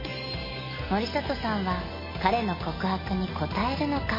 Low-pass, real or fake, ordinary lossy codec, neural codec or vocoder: 5.4 kHz; real; MP3, 24 kbps; none